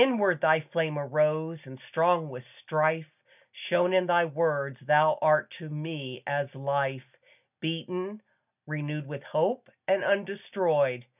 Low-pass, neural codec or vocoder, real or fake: 3.6 kHz; none; real